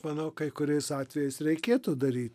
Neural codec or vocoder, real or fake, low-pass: none; real; 14.4 kHz